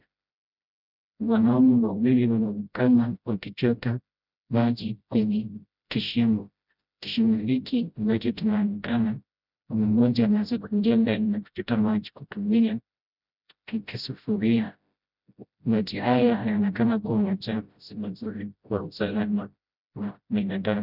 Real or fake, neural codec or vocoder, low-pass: fake; codec, 16 kHz, 0.5 kbps, FreqCodec, smaller model; 5.4 kHz